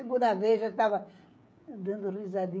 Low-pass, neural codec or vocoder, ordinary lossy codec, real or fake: none; codec, 16 kHz, 16 kbps, FreqCodec, smaller model; none; fake